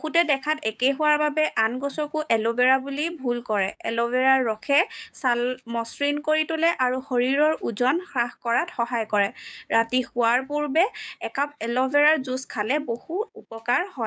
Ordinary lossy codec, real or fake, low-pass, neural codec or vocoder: none; fake; none; codec, 16 kHz, 6 kbps, DAC